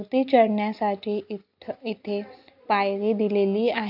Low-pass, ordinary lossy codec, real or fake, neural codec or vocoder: 5.4 kHz; AAC, 48 kbps; real; none